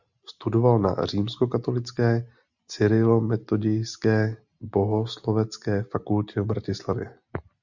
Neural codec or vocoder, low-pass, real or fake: none; 7.2 kHz; real